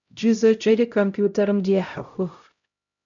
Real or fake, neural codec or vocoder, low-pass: fake; codec, 16 kHz, 0.5 kbps, X-Codec, HuBERT features, trained on LibriSpeech; 7.2 kHz